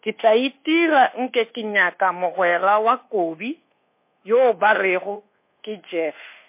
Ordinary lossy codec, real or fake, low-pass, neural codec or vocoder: MP3, 24 kbps; fake; 3.6 kHz; codec, 24 kHz, 1.2 kbps, DualCodec